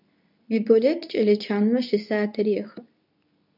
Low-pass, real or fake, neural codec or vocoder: 5.4 kHz; fake; codec, 24 kHz, 0.9 kbps, WavTokenizer, medium speech release version 2